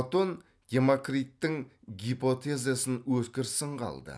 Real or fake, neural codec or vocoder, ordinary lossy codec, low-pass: real; none; none; none